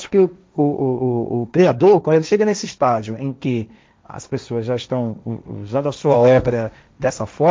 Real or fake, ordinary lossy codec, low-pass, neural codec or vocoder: fake; none; none; codec, 16 kHz, 1.1 kbps, Voila-Tokenizer